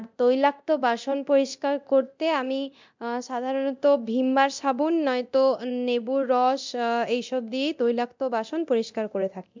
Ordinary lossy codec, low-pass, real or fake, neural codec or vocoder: MP3, 64 kbps; 7.2 kHz; fake; codec, 24 kHz, 0.9 kbps, DualCodec